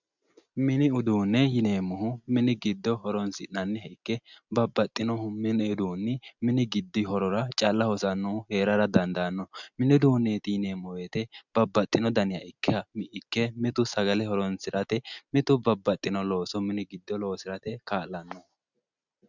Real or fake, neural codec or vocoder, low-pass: real; none; 7.2 kHz